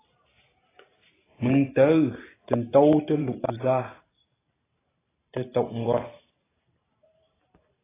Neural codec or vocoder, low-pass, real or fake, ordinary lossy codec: vocoder, 44.1 kHz, 128 mel bands every 512 samples, BigVGAN v2; 3.6 kHz; fake; AAC, 16 kbps